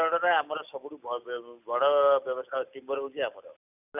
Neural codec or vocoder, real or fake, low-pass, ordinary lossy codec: none; real; 3.6 kHz; none